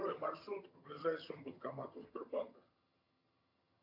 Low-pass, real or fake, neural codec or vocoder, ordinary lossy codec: 5.4 kHz; fake; vocoder, 22.05 kHz, 80 mel bands, HiFi-GAN; AAC, 32 kbps